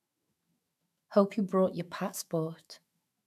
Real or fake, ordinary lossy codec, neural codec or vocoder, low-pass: fake; none; autoencoder, 48 kHz, 128 numbers a frame, DAC-VAE, trained on Japanese speech; 14.4 kHz